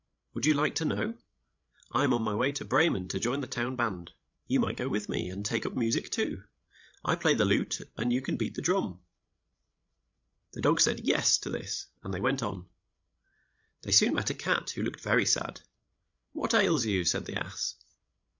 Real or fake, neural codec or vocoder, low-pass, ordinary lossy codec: fake; codec, 16 kHz, 16 kbps, FreqCodec, larger model; 7.2 kHz; MP3, 64 kbps